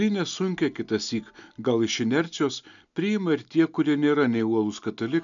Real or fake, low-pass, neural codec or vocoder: real; 7.2 kHz; none